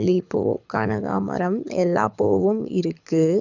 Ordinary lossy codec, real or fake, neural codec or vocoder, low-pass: none; fake; codec, 24 kHz, 6 kbps, HILCodec; 7.2 kHz